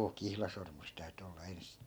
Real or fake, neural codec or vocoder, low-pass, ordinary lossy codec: real; none; none; none